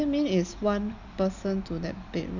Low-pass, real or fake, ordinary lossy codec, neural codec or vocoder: 7.2 kHz; real; none; none